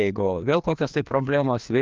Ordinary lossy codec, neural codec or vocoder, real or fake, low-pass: Opus, 24 kbps; codec, 16 kHz, 2 kbps, FreqCodec, larger model; fake; 7.2 kHz